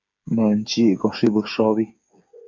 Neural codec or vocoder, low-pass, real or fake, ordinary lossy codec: codec, 16 kHz, 8 kbps, FreqCodec, smaller model; 7.2 kHz; fake; MP3, 48 kbps